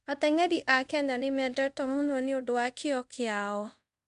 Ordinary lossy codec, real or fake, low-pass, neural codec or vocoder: MP3, 64 kbps; fake; 10.8 kHz; codec, 24 kHz, 0.5 kbps, DualCodec